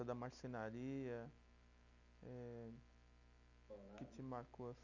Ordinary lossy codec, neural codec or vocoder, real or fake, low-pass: none; none; real; 7.2 kHz